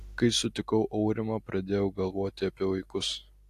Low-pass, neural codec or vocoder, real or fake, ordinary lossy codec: 14.4 kHz; none; real; AAC, 64 kbps